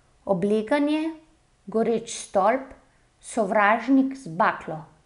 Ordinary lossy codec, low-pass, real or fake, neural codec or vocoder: none; 10.8 kHz; real; none